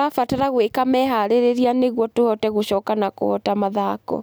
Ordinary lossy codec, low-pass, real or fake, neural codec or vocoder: none; none; real; none